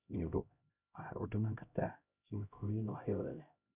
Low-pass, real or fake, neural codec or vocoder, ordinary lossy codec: 3.6 kHz; fake; codec, 16 kHz, 0.5 kbps, X-Codec, HuBERT features, trained on LibriSpeech; none